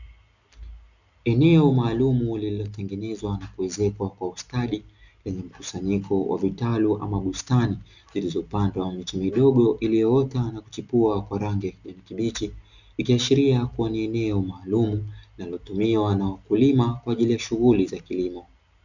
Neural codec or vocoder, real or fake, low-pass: none; real; 7.2 kHz